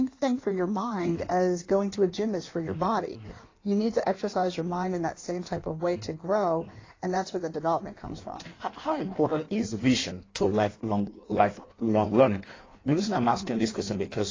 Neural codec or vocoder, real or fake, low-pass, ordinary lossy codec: codec, 16 kHz in and 24 kHz out, 1.1 kbps, FireRedTTS-2 codec; fake; 7.2 kHz; AAC, 32 kbps